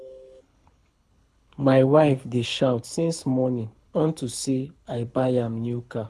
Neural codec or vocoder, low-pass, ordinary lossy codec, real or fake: codec, 24 kHz, 6 kbps, HILCodec; none; none; fake